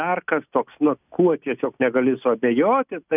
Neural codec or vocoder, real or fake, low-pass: none; real; 3.6 kHz